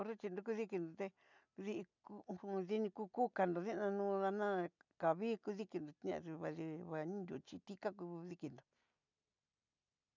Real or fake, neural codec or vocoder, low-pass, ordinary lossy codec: real; none; 7.2 kHz; none